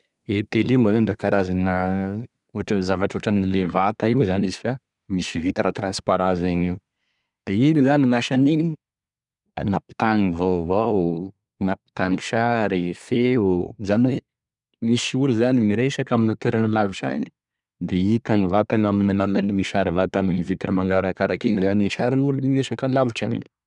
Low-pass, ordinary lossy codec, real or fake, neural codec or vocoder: 10.8 kHz; none; fake; codec, 24 kHz, 1 kbps, SNAC